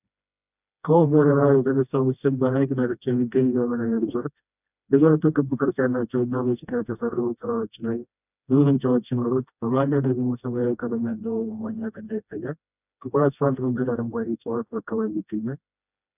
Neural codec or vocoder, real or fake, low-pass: codec, 16 kHz, 1 kbps, FreqCodec, smaller model; fake; 3.6 kHz